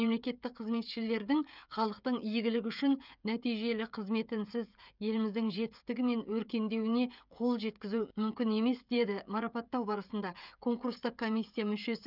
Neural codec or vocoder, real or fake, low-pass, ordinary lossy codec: codec, 16 kHz, 16 kbps, FreqCodec, smaller model; fake; 5.4 kHz; none